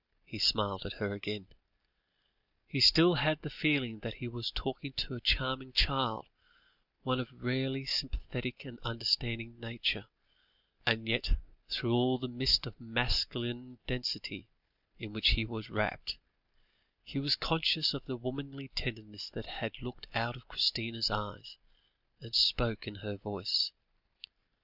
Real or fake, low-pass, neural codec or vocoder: real; 5.4 kHz; none